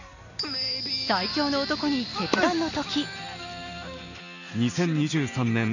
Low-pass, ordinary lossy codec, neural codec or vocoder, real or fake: 7.2 kHz; none; none; real